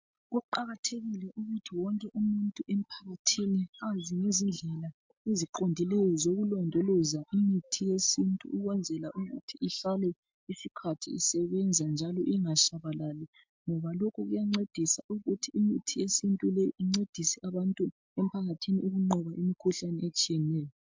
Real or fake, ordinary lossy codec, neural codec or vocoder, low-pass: real; MP3, 64 kbps; none; 7.2 kHz